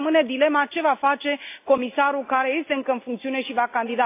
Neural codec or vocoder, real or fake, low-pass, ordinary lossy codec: none; real; 3.6 kHz; AAC, 24 kbps